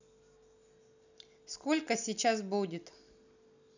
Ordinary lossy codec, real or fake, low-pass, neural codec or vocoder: none; real; 7.2 kHz; none